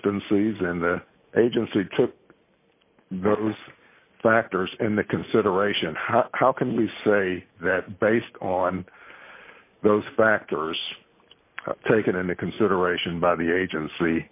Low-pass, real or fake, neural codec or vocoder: 3.6 kHz; real; none